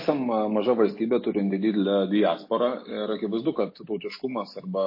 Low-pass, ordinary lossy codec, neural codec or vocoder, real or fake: 5.4 kHz; MP3, 24 kbps; none; real